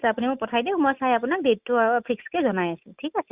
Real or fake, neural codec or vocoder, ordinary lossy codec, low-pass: real; none; Opus, 64 kbps; 3.6 kHz